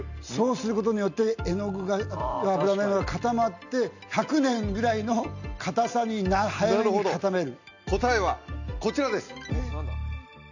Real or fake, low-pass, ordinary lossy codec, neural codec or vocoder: real; 7.2 kHz; none; none